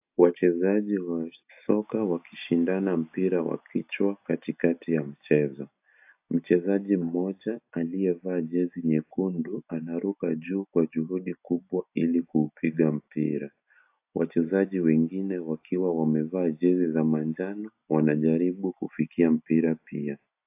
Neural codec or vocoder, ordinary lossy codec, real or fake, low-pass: none; AAC, 32 kbps; real; 3.6 kHz